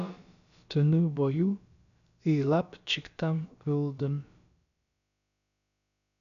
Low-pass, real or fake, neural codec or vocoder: 7.2 kHz; fake; codec, 16 kHz, about 1 kbps, DyCAST, with the encoder's durations